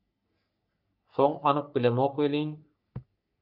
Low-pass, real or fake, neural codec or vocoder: 5.4 kHz; fake; codec, 44.1 kHz, 3.4 kbps, Pupu-Codec